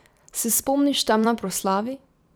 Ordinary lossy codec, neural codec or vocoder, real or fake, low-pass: none; vocoder, 44.1 kHz, 128 mel bands every 256 samples, BigVGAN v2; fake; none